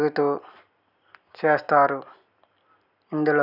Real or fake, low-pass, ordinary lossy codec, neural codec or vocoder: real; 5.4 kHz; none; none